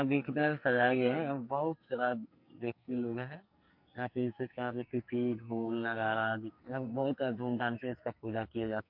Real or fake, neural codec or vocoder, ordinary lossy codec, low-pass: fake; codec, 44.1 kHz, 2.6 kbps, SNAC; MP3, 48 kbps; 5.4 kHz